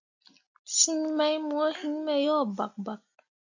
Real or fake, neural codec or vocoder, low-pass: real; none; 7.2 kHz